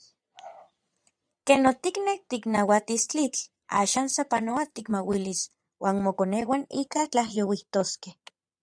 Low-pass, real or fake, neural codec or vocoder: 9.9 kHz; fake; vocoder, 22.05 kHz, 80 mel bands, Vocos